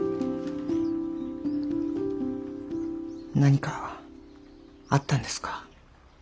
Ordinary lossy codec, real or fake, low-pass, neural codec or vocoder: none; real; none; none